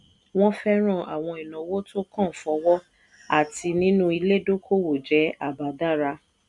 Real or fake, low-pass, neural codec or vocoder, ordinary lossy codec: real; 10.8 kHz; none; none